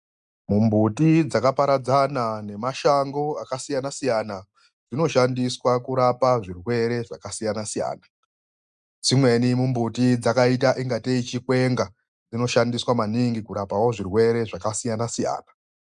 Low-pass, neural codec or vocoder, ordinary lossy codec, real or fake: 9.9 kHz; none; MP3, 96 kbps; real